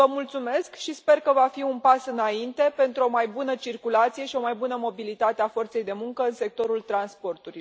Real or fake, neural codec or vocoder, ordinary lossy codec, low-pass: real; none; none; none